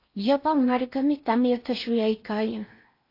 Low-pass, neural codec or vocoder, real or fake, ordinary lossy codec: 5.4 kHz; codec, 16 kHz in and 24 kHz out, 0.6 kbps, FocalCodec, streaming, 4096 codes; fake; AAC, 32 kbps